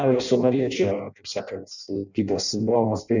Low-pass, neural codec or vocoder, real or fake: 7.2 kHz; codec, 16 kHz in and 24 kHz out, 0.6 kbps, FireRedTTS-2 codec; fake